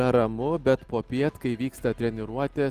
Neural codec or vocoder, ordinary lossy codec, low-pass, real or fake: none; Opus, 24 kbps; 14.4 kHz; real